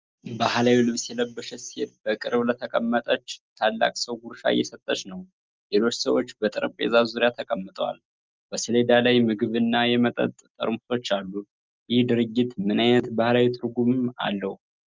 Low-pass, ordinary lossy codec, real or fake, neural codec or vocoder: 7.2 kHz; Opus, 24 kbps; real; none